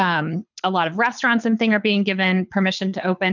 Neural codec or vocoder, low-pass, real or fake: codec, 24 kHz, 6 kbps, HILCodec; 7.2 kHz; fake